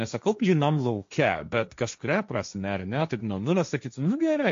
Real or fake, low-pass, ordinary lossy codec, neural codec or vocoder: fake; 7.2 kHz; MP3, 48 kbps; codec, 16 kHz, 1.1 kbps, Voila-Tokenizer